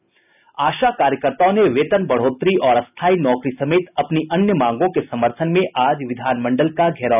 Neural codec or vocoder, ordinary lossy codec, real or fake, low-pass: none; none; real; 3.6 kHz